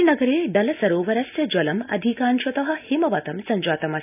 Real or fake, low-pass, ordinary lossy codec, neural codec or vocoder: real; 3.6 kHz; none; none